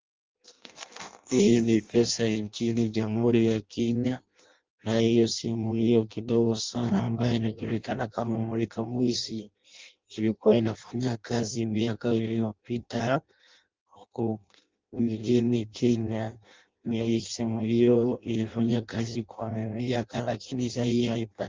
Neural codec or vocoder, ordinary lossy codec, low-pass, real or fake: codec, 16 kHz in and 24 kHz out, 0.6 kbps, FireRedTTS-2 codec; Opus, 32 kbps; 7.2 kHz; fake